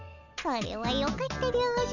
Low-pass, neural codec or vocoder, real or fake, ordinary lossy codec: 7.2 kHz; none; real; none